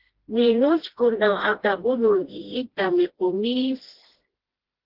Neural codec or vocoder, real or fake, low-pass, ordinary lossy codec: codec, 16 kHz, 1 kbps, FreqCodec, smaller model; fake; 5.4 kHz; Opus, 16 kbps